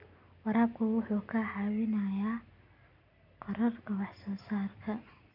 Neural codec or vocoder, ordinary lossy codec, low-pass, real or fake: none; none; 5.4 kHz; real